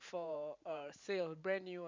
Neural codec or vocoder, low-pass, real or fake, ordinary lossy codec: vocoder, 44.1 kHz, 128 mel bands every 512 samples, BigVGAN v2; 7.2 kHz; fake; MP3, 64 kbps